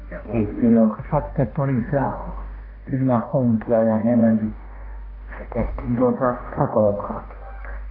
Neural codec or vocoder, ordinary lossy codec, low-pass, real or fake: codec, 16 kHz, 1 kbps, X-Codec, HuBERT features, trained on balanced general audio; AAC, 24 kbps; 5.4 kHz; fake